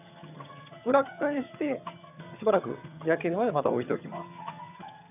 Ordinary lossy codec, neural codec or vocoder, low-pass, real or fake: none; vocoder, 22.05 kHz, 80 mel bands, HiFi-GAN; 3.6 kHz; fake